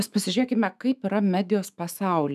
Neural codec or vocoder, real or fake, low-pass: autoencoder, 48 kHz, 128 numbers a frame, DAC-VAE, trained on Japanese speech; fake; 14.4 kHz